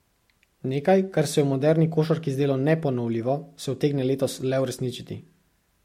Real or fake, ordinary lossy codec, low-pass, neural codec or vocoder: real; MP3, 64 kbps; 19.8 kHz; none